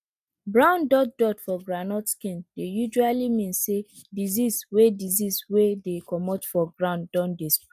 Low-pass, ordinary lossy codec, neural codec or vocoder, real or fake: 14.4 kHz; none; none; real